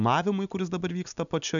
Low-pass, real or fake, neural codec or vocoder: 7.2 kHz; real; none